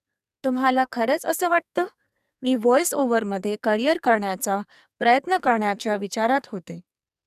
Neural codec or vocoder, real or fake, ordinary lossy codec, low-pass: codec, 44.1 kHz, 2.6 kbps, SNAC; fake; none; 14.4 kHz